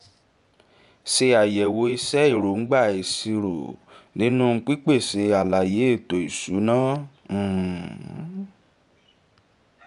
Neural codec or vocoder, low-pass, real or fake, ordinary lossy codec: vocoder, 24 kHz, 100 mel bands, Vocos; 10.8 kHz; fake; none